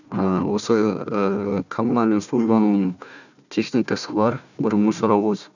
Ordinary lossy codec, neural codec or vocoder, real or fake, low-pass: none; codec, 16 kHz, 1 kbps, FunCodec, trained on Chinese and English, 50 frames a second; fake; 7.2 kHz